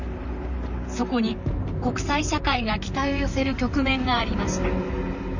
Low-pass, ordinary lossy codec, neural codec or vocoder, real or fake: 7.2 kHz; none; vocoder, 44.1 kHz, 128 mel bands, Pupu-Vocoder; fake